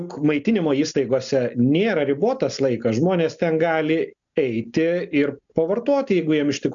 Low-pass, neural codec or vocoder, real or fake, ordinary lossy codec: 7.2 kHz; none; real; MP3, 96 kbps